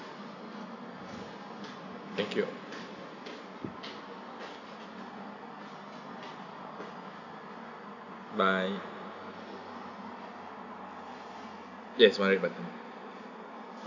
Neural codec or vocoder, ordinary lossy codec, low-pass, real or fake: none; none; 7.2 kHz; real